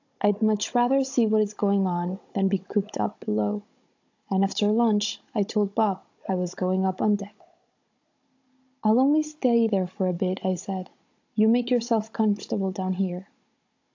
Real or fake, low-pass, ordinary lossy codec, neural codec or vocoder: fake; 7.2 kHz; AAC, 48 kbps; codec, 16 kHz, 16 kbps, FunCodec, trained on Chinese and English, 50 frames a second